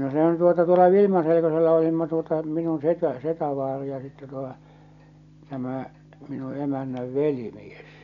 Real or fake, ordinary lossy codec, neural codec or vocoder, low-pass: real; none; none; 7.2 kHz